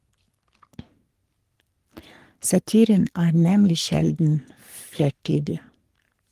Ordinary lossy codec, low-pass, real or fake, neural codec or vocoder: Opus, 24 kbps; 14.4 kHz; fake; codec, 44.1 kHz, 3.4 kbps, Pupu-Codec